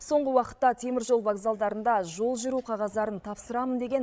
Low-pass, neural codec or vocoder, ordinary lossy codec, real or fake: none; codec, 16 kHz, 16 kbps, FreqCodec, larger model; none; fake